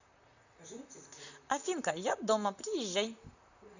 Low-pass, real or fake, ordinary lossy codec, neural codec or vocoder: 7.2 kHz; fake; none; vocoder, 44.1 kHz, 128 mel bands, Pupu-Vocoder